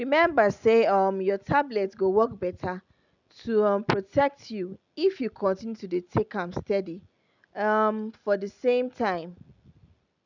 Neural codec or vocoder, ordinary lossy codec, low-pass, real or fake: none; none; 7.2 kHz; real